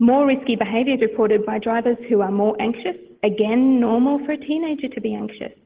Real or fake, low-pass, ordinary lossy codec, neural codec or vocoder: real; 3.6 kHz; Opus, 16 kbps; none